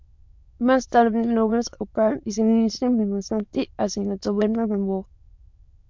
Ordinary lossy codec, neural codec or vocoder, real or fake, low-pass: MP3, 64 kbps; autoencoder, 22.05 kHz, a latent of 192 numbers a frame, VITS, trained on many speakers; fake; 7.2 kHz